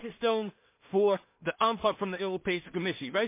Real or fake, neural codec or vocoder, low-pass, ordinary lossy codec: fake; codec, 16 kHz in and 24 kHz out, 0.4 kbps, LongCat-Audio-Codec, two codebook decoder; 3.6 kHz; MP3, 24 kbps